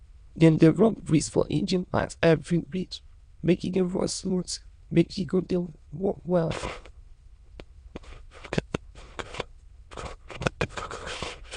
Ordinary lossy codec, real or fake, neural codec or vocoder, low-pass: Opus, 64 kbps; fake; autoencoder, 22.05 kHz, a latent of 192 numbers a frame, VITS, trained on many speakers; 9.9 kHz